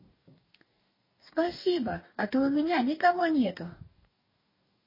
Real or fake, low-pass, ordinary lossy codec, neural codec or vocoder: fake; 5.4 kHz; MP3, 24 kbps; codec, 44.1 kHz, 2.6 kbps, DAC